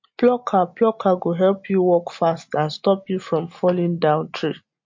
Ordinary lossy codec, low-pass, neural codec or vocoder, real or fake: MP3, 48 kbps; 7.2 kHz; none; real